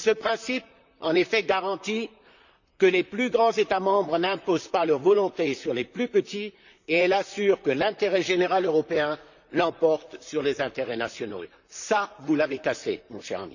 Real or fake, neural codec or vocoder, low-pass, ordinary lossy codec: fake; vocoder, 44.1 kHz, 128 mel bands, Pupu-Vocoder; 7.2 kHz; none